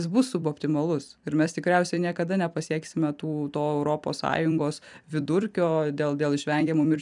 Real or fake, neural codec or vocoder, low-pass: fake; vocoder, 44.1 kHz, 128 mel bands every 256 samples, BigVGAN v2; 10.8 kHz